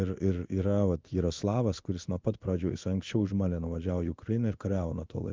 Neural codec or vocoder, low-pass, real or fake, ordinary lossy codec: codec, 16 kHz in and 24 kHz out, 1 kbps, XY-Tokenizer; 7.2 kHz; fake; Opus, 32 kbps